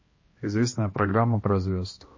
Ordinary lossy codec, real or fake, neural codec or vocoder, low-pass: MP3, 32 kbps; fake; codec, 16 kHz, 1 kbps, X-Codec, HuBERT features, trained on balanced general audio; 7.2 kHz